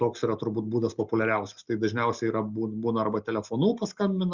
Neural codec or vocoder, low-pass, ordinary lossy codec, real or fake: none; 7.2 kHz; Opus, 64 kbps; real